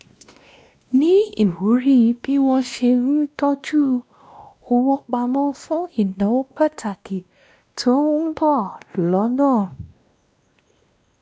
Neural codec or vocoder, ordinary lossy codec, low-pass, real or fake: codec, 16 kHz, 1 kbps, X-Codec, WavLM features, trained on Multilingual LibriSpeech; none; none; fake